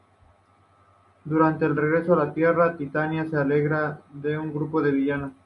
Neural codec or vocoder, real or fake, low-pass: none; real; 10.8 kHz